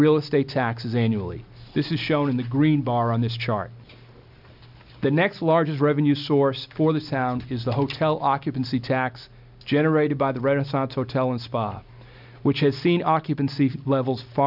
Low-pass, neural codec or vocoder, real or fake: 5.4 kHz; none; real